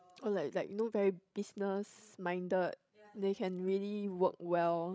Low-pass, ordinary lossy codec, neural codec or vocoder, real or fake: none; none; codec, 16 kHz, 16 kbps, FreqCodec, larger model; fake